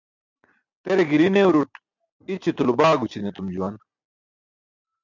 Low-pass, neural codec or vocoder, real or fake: 7.2 kHz; none; real